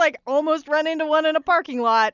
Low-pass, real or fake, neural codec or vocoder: 7.2 kHz; real; none